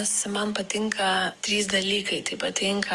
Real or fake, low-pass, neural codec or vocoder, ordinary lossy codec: fake; 10.8 kHz; codec, 44.1 kHz, 7.8 kbps, DAC; Opus, 32 kbps